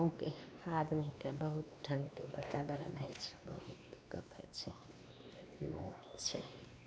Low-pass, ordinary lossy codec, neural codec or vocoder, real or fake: none; none; codec, 16 kHz, 2 kbps, X-Codec, WavLM features, trained on Multilingual LibriSpeech; fake